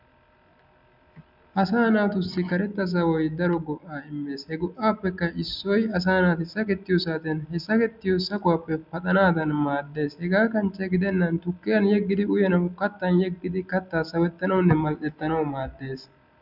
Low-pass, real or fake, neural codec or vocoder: 5.4 kHz; real; none